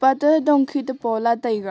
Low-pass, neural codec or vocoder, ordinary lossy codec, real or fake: none; none; none; real